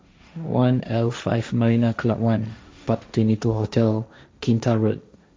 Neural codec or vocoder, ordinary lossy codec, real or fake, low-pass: codec, 16 kHz, 1.1 kbps, Voila-Tokenizer; none; fake; none